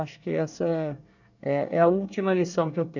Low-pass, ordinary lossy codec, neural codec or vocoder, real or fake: 7.2 kHz; none; codec, 24 kHz, 1 kbps, SNAC; fake